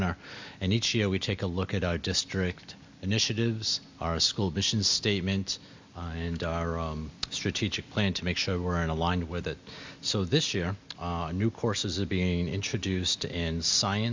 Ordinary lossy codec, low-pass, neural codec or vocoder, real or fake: MP3, 64 kbps; 7.2 kHz; none; real